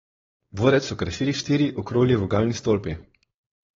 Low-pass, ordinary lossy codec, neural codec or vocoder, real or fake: 7.2 kHz; AAC, 24 kbps; codec, 16 kHz, 4.8 kbps, FACodec; fake